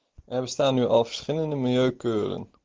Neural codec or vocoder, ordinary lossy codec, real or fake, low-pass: none; Opus, 16 kbps; real; 7.2 kHz